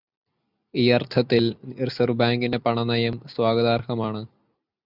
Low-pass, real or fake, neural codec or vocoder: 5.4 kHz; real; none